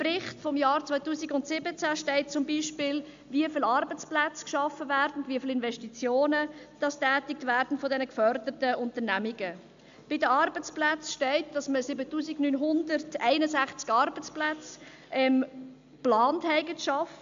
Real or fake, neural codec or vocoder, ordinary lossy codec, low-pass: real; none; AAC, 64 kbps; 7.2 kHz